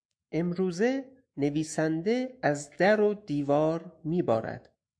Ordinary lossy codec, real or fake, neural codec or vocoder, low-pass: AAC, 64 kbps; fake; autoencoder, 48 kHz, 128 numbers a frame, DAC-VAE, trained on Japanese speech; 9.9 kHz